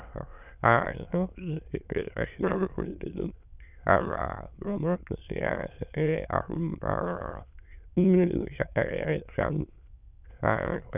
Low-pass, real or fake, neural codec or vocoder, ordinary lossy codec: 3.6 kHz; fake; autoencoder, 22.05 kHz, a latent of 192 numbers a frame, VITS, trained on many speakers; none